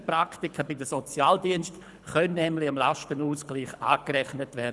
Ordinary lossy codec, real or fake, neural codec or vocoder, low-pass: none; fake; codec, 24 kHz, 6 kbps, HILCodec; none